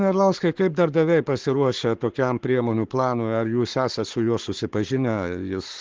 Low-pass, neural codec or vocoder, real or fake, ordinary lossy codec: 7.2 kHz; codec, 44.1 kHz, 7.8 kbps, Pupu-Codec; fake; Opus, 16 kbps